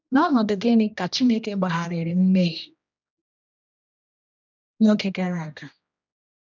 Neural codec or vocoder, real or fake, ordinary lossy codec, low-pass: codec, 16 kHz, 1 kbps, X-Codec, HuBERT features, trained on general audio; fake; none; 7.2 kHz